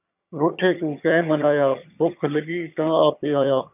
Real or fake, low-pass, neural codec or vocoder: fake; 3.6 kHz; vocoder, 22.05 kHz, 80 mel bands, HiFi-GAN